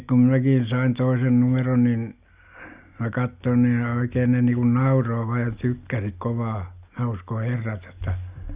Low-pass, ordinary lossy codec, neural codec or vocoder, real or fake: 3.6 kHz; Opus, 64 kbps; none; real